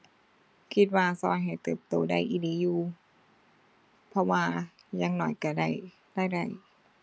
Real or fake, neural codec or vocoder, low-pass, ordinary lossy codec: real; none; none; none